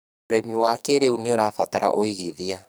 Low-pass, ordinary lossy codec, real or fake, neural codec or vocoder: none; none; fake; codec, 44.1 kHz, 2.6 kbps, SNAC